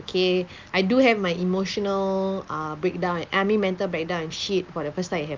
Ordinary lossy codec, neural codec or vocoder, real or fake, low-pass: Opus, 24 kbps; none; real; 7.2 kHz